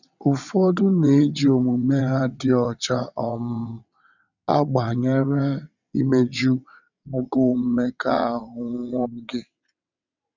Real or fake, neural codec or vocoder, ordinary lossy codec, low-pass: fake; vocoder, 22.05 kHz, 80 mel bands, WaveNeXt; none; 7.2 kHz